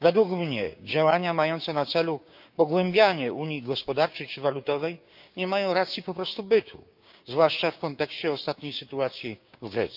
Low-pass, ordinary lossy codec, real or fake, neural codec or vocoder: 5.4 kHz; none; fake; codec, 16 kHz, 6 kbps, DAC